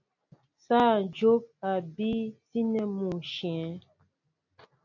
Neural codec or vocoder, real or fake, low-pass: none; real; 7.2 kHz